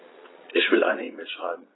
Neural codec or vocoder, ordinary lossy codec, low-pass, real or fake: vocoder, 22.05 kHz, 80 mel bands, Vocos; AAC, 16 kbps; 7.2 kHz; fake